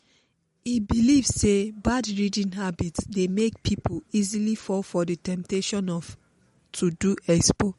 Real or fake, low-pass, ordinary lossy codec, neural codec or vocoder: real; 19.8 kHz; MP3, 48 kbps; none